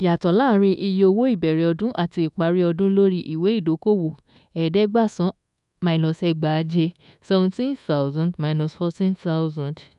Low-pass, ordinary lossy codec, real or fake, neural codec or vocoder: 10.8 kHz; none; fake; codec, 24 kHz, 1.2 kbps, DualCodec